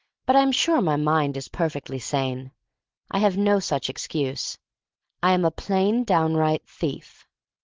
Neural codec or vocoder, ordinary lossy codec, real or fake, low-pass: none; Opus, 16 kbps; real; 7.2 kHz